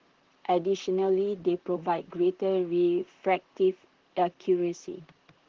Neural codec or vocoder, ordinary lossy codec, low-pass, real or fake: vocoder, 44.1 kHz, 128 mel bands, Pupu-Vocoder; Opus, 16 kbps; 7.2 kHz; fake